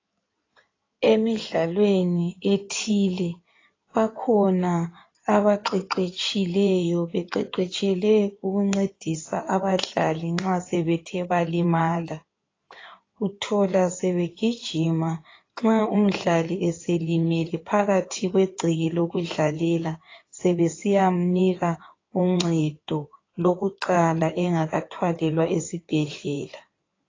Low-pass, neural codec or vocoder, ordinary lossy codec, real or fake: 7.2 kHz; codec, 16 kHz in and 24 kHz out, 2.2 kbps, FireRedTTS-2 codec; AAC, 32 kbps; fake